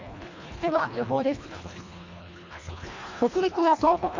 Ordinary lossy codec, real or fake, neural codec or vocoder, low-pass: MP3, 64 kbps; fake; codec, 24 kHz, 1.5 kbps, HILCodec; 7.2 kHz